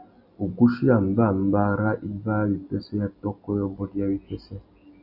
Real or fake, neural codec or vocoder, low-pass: real; none; 5.4 kHz